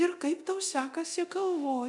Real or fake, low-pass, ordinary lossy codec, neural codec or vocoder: fake; 10.8 kHz; MP3, 96 kbps; codec, 24 kHz, 0.9 kbps, DualCodec